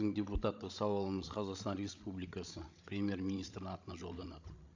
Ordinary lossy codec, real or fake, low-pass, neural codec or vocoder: AAC, 48 kbps; fake; 7.2 kHz; codec, 16 kHz, 16 kbps, FreqCodec, larger model